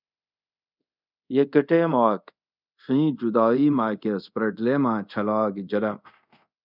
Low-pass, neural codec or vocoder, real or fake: 5.4 kHz; codec, 24 kHz, 0.5 kbps, DualCodec; fake